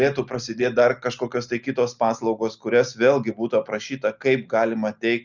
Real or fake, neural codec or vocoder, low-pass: real; none; 7.2 kHz